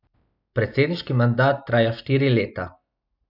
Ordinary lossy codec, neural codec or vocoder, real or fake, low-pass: none; none; real; 5.4 kHz